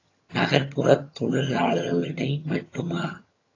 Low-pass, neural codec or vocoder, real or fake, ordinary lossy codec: 7.2 kHz; vocoder, 22.05 kHz, 80 mel bands, HiFi-GAN; fake; AAC, 32 kbps